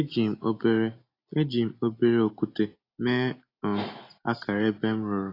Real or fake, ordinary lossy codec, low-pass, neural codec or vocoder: real; AAC, 32 kbps; 5.4 kHz; none